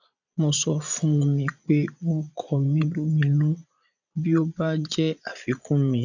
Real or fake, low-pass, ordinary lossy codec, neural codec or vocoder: fake; 7.2 kHz; none; vocoder, 22.05 kHz, 80 mel bands, Vocos